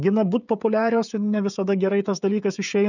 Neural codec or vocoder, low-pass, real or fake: codec, 16 kHz, 16 kbps, FreqCodec, smaller model; 7.2 kHz; fake